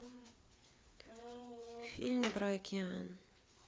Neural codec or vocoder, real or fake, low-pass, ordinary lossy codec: codec, 16 kHz, 4 kbps, FreqCodec, larger model; fake; none; none